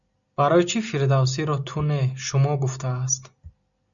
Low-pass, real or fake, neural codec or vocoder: 7.2 kHz; real; none